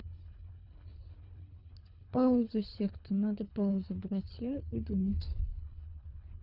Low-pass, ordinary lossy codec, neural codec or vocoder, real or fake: 5.4 kHz; none; codec, 24 kHz, 3 kbps, HILCodec; fake